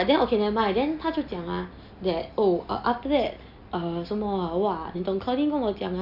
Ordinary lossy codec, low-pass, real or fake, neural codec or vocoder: none; 5.4 kHz; real; none